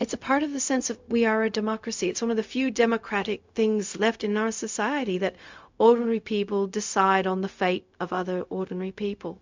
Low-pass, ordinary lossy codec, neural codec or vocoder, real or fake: 7.2 kHz; MP3, 64 kbps; codec, 16 kHz, 0.4 kbps, LongCat-Audio-Codec; fake